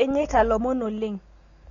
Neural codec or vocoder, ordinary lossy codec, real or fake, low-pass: none; AAC, 32 kbps; real; 7.2 kHz